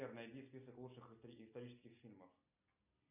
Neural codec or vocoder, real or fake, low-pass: none; real; 3.6 kHz